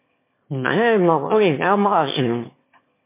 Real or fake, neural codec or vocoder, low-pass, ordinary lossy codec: fake; autoencoder, 22.05 kHz, a latent of 192 numbers a frame, VITS, trained on one speaker; 3.6 kHz; MP3, 24 kbps